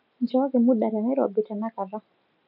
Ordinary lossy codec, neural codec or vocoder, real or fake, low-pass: none; none; real; 5.4 kHz